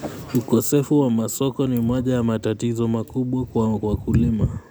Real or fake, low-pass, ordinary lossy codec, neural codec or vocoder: real; none; none; none